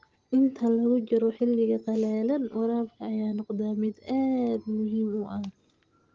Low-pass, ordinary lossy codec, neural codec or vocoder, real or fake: 7.2 kHz; Opus, 16 kbps; none; real